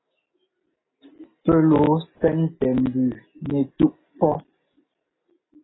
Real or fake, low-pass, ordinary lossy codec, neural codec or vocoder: real; 7.2 kHz; AAC, 16 kbps; none